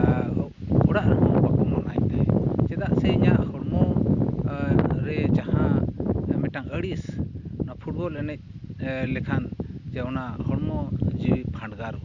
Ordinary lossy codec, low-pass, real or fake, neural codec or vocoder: Opus, 64 kbps; 7.2 kHz; real; none